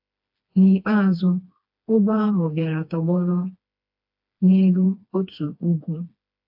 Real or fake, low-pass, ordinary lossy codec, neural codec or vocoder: fake; 5.4 kHz; AAC, 48 kbps; codec, 16 kHz, 2 kbps, FreqCodec, smaller model